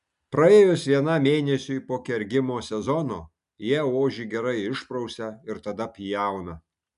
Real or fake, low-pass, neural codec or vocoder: real; 10.8 kHz; none